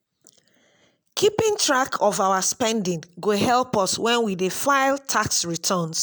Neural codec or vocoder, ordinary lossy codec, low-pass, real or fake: none; none; none; real